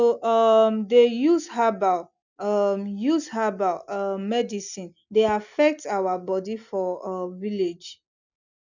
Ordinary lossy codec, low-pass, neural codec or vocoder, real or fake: none; 7.2 kHz; none; real